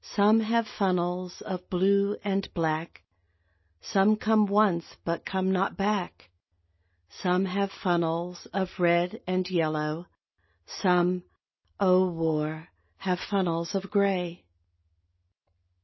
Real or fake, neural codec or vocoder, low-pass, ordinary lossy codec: real; none; 7.2 kHz; MP3, 24 kbps